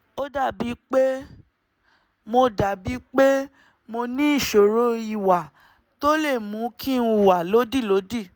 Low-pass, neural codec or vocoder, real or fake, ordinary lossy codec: none; none; real; none